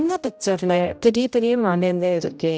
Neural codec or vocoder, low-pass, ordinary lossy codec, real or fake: codec, 16 kHz, 0.5 kbps, X-Codec, HuBERT features, trained on general audio; none; none; fake